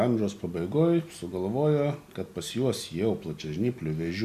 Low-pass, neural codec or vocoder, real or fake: 14.4 kHz; none; real